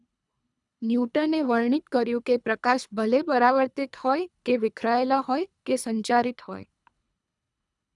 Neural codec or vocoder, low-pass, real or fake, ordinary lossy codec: codec, 24 kHz, 3 kbps, HILCodec; 10.8 kHz; fake; none